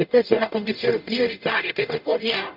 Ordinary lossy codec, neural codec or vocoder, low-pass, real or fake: none; codec, 44.1 kHz, 0.9 kbps, DAC; 5.4 kHz; fake